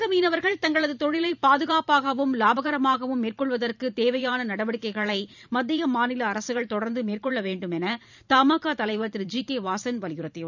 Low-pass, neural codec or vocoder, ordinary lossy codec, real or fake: 7.2 kHz; vocoder, 44.1 kHz, 128 mel bands every 512 samples, BigVGAN v2; none; fake